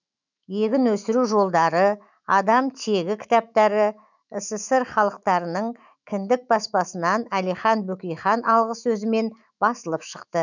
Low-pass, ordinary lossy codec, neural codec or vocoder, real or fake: 7.2 kHz; none; autoencoder, 48 kHz, 128 numbers a frame, DAC-VAE, trained on Japanese speech; fake